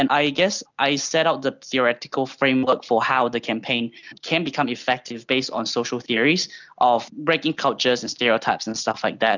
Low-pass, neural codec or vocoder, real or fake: 7.2 kHz; none; real